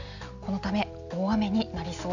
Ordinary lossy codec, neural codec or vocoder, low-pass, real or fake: none; none; 7.2 kHz; real